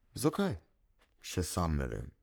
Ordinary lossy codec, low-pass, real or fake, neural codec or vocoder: none; none; fake; codec, 44.1 kHz, 3.4 kbps, Pupu-Codec